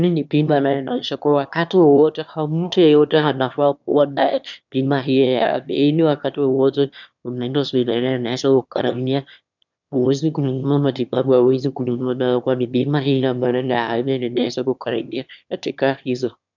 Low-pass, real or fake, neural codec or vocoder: 7.2 kHz; fake; autoencoder, 22.05 kHz, a latent of 192 numbers a frame, VITS, trained on one speaker